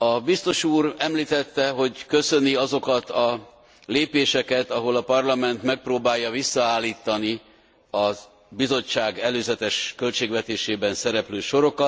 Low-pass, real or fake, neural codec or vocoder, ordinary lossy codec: none; real; none; none